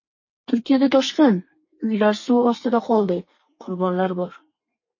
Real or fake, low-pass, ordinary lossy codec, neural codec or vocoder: fake; 7.2 kHz; MP3, 32 kbps; codec, 32 kHz, 1.9 kbps, SNAC